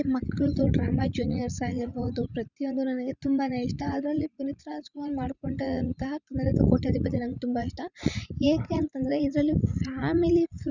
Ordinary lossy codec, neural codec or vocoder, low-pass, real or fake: Opus, 64 kbps; vocoder, 44.1 kHz, 80 mel bands, Vocos; 7.2 kHz; fake